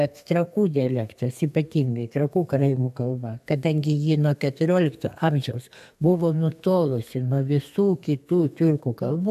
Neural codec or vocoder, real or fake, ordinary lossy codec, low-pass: codec, 32 kHz, 1.9 kbps, SNAC; fake; AAC, 96 kbps; 14.4 kHz